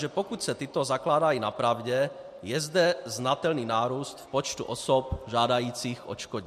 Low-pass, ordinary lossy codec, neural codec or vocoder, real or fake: 14.4 kHz; MP3, 64 kbps; none; real